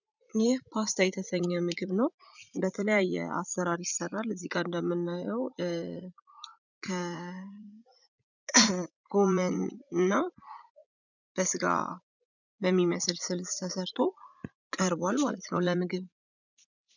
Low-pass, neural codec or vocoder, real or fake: 7.2 kHz; vocoder, 44.1 kHz, 80 mel bands, Vocos; fake